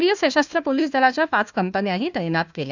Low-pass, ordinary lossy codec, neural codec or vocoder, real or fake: 7.2 kHz; none; codec, 16 kHz, 1 kbps, FunCodec, trained on Chinese and English, 50 frames a second; fake